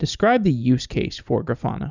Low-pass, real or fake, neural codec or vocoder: 7.2 kHz; real; none